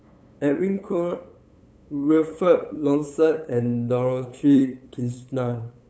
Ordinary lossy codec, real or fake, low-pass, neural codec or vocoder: none; fake; none; codec, 16 kHz, 8 kbps, FunCodec, trained on LibriTTS, 25 frames a second